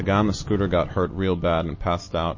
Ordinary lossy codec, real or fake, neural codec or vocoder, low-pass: MP3, 32 kbps; real; none; 7.2 kHz